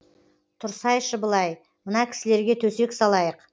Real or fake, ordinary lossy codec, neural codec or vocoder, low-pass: real; none; none; none